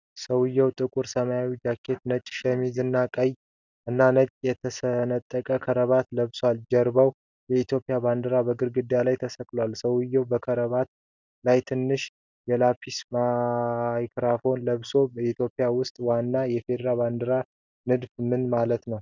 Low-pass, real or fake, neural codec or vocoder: 7.2 kHz; real; none